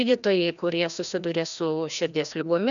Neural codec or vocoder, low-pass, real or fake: codec, 16 kHz, 1 kbps, FreqCodec, larger model; 7.2 kHz; fake